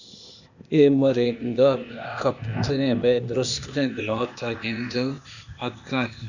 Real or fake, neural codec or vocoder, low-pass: fake; codec, 16 kHz, 0.8 kbps, ZipCodec; 7.2 kHz